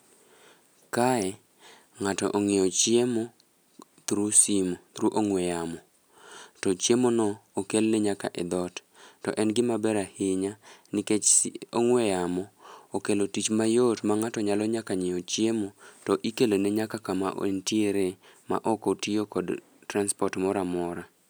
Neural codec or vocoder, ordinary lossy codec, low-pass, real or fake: none; none; none; real